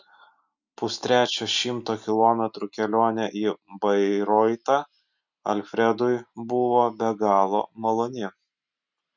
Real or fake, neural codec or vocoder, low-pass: real; none; 7.2 kHz